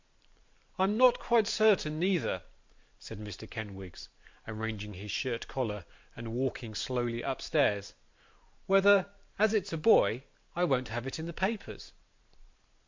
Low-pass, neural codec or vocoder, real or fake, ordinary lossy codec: 7.2 kHz; vocoder, 44.1 kHz, 128 mel bands every 512 samples, BigVGAN v2; fake; MP3, 48 kbps